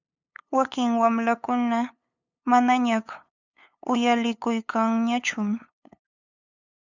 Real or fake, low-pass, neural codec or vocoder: fake; 7.2 kHz; codec, 16 kHz, 8 kbps, FunCodec, trained on LibriTTS, 25 frames a second